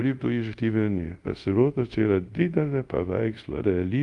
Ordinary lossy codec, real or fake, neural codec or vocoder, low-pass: Opus, 32 kbps; fake; codec, 24 kHz, 0.5 kbps, DualCodec; 10.8 kHz